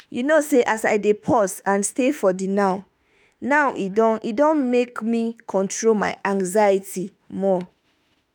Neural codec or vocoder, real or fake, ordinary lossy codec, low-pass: autoencoder, 48 kHz, 32 numbers a frame, DAC-VAE, trained on Japanese speech; fake; none; none